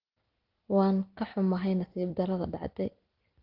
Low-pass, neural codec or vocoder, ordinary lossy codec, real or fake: 5.4 kHz; none; Opus, 16 kbps; real